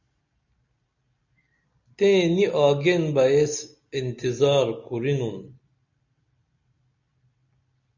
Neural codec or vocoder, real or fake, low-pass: none; real; 7.2 kHz